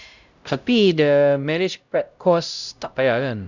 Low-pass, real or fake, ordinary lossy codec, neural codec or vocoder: 7.2 kHz; fake; Opus, 64 kbps; codec, 16 kHz, 0.5 kbps, X-Codec, HuBERT features, trained on LibriSpeech